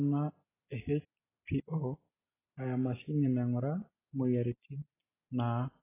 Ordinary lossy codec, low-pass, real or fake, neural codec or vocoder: AAC, 16 kbps; 3.6 kHz; real; none